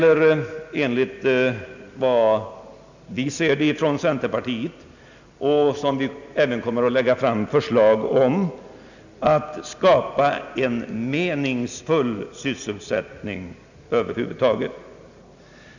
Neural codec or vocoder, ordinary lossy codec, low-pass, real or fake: none; none; 7.2 kHz; real